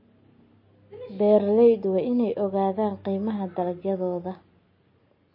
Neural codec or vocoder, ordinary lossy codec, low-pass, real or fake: none; MP3, 24 kbps; 5.4 kHz; real